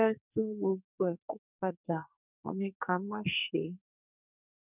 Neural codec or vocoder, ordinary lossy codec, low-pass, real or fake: codec, 44.1 kHz, 2.6 kbps, SNAC; none; 3.6 kHz; fake